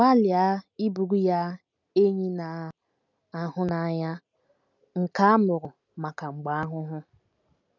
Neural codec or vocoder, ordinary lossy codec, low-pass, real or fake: none; none; 7.2 kHz; real